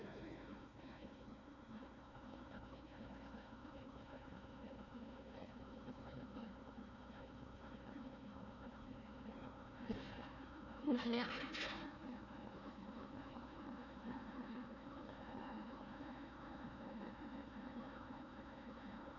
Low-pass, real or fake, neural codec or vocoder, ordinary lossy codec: 7.2 kHz; fake; codec, 16 kHz, 1 kbps, FunCodec, trained on Chinese and English, 50 frames a second; none